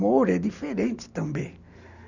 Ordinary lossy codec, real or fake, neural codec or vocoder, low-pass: none; real; none; 7.2 kHz